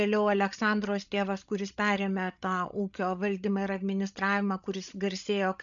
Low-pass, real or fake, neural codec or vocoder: 7.2 kHz; fake; codec, 16 kHz, 16 kbps, FreqCodec, larger model